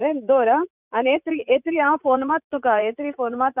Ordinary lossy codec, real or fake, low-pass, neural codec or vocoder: none; fake; 3.6 kHz; vocoder, 44.1 kHz, 80 mel bands, Vocos